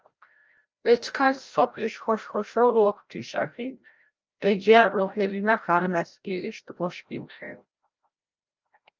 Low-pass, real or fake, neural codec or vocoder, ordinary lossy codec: 7.2 kHz; fake; codec, 16 kHz, 0.5 kbps, FreqCodec, larger model; Opus, 24 kbps